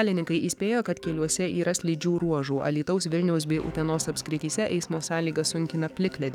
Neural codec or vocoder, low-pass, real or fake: codec, 44.1 kHz, 7.8 kbps, DAC; 19.8 kHz; fake